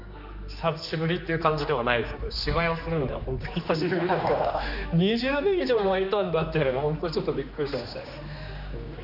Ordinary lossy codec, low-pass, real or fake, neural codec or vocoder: none; 5.4 kHz; fake; codec, 16 kHz, 2 kbps, X-Codec, HuBERT features, trained on general audio